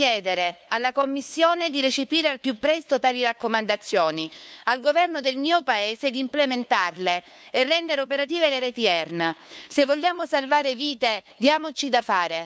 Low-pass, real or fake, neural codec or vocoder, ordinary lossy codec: none; fake; codec, 16 kHz, 2 kbps, FunCodec, trained on LibriTTS, 25 frames a second; none